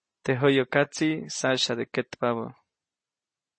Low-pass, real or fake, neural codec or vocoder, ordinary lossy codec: 9.9 kHz; real; none; MP3, 32 kbps